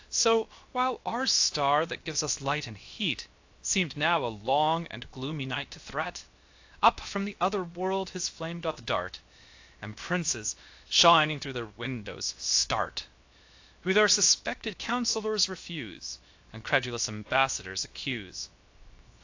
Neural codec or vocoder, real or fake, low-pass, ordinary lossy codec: codec, 16 kHz, 0.7 kbps, FocalCodec; fake; 7.2 kHz; AAC, 48 kbps